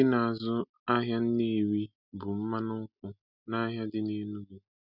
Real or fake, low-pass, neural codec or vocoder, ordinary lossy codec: real; 5.4 kHz; none; none